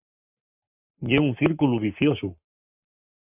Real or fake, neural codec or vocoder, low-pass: fake; codec, 44.1 kHz, 7.8 kbps, Pupu-Codec; 3.6 kHz